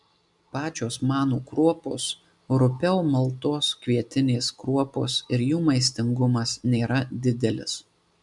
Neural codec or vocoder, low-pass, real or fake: none; 10.8 kHz; real